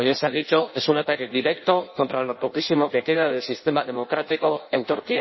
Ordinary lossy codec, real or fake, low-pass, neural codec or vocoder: MP3, 24 kbps; fake; 7.2 kHz; codec, 16 kHz in and 24 kHz out, 0.6 kbps, FireRedTTS-2 codec